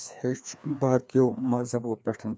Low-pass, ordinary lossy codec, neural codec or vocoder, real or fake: none; none; codec, 16 kHz, 2 kbps, FreqCodec, larger model; fake